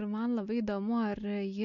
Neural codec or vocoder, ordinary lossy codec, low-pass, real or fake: none; MP3, 48 kbps; 7.2 kHz; real